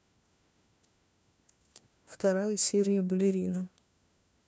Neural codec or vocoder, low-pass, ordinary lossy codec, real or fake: codec, 16 kHz, 1 kbps, FunCodec, trained on LibriTTS, 50 frames a second; none; none; fake